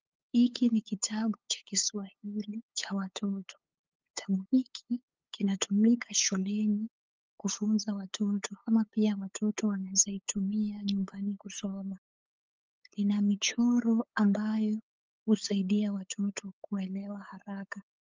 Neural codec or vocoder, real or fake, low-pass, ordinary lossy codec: codec, 16 kHz, 8 kbps, FunCodec, trained on LibriTTS, 25 frames a second; fake; 7.2 kHz; Opus, 24 kbps